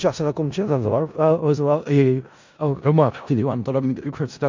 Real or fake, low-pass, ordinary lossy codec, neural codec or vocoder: fake; 7.2 kHz; MP3, 64 kbps; codec, 16 kHz in and 24 kHz out, 0.4 kbps, LongCat-Audio-Codec, four codebook decoder